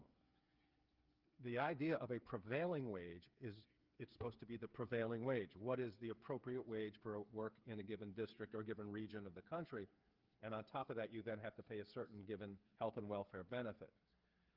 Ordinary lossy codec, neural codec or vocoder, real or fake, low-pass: Opus, 32 kbps; codec, 16 kHz, 8 kbps, FreqCodec, smaller model; fake; 5.4 kHz